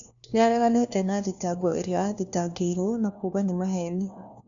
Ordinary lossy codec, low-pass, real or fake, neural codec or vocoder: none; 7.2 kHz; fake; codec, 16 kHz, 1 kbps, FunCodec, trained on LibriTTS, 50 frames a second